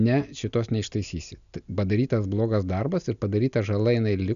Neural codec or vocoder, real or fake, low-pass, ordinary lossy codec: none; real; 7.2 kHz; MP3, 96 kbps